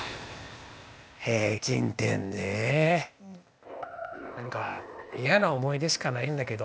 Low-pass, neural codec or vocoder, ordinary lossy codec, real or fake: none; codec, 16 kHz, 0.8 kbps, ZipCodec; none; fake